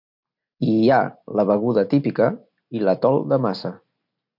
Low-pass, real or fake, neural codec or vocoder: 5.4 kHz; real; none